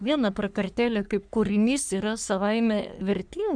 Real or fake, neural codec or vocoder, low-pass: fake; codec, 44.1 kHz, 3.4 kbps, Pupu-Codec; 9.9 kHz